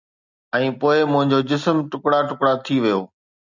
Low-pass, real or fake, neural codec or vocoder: 7.2 kHz; real; none